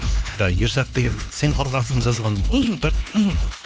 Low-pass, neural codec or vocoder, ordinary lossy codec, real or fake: none; codec, 16 kHz, 2 kbps, X-Codec, HuBERT features, trained on LibriSpeech; none; fake